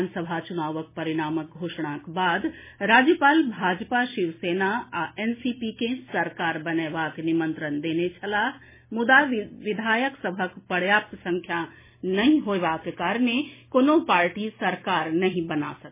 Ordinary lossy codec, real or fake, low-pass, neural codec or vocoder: MP3, 16 kbps; real; 3.6 kHz; none